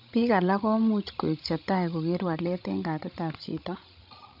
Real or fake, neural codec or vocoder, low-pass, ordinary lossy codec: fake; codec, 16 kHz, 16 kbps, FreqCodec, larger model; 5.4 kHz; MP3, 48 kbps